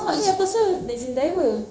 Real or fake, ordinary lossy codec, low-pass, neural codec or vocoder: fake; none; none; codec, 16 kHz, 0.9 kbps, LongCat-Audio-Codec